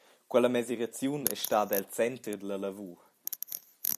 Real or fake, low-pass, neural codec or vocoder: fake; 14.4 kHz; vocoder, 44.1 kHz, 128 mel bands every 256 samples, BigVGAN v2